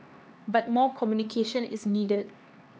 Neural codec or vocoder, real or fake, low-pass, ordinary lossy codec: codec, 16 kHz, 2 kbps, X-Codec, HuBERT features, trained on LibriSpeech; fake; none; none